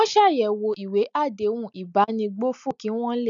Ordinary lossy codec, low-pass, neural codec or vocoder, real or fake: none; 7.2 kHz; none; real